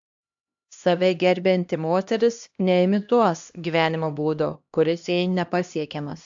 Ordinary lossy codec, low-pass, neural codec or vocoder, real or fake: AAC, 64 kbps; 7.2 kHz; codec, 16 kHz, 1 kbps, X-Codec, HuBERT features, trained on LibriSpeech; fake